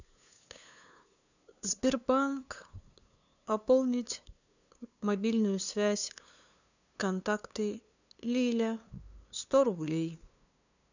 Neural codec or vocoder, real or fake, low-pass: codec, 16 kHz, 2 kbps, FunCodec, trained on LibriTTS, 25 frames a second; fake; 7.2 kHz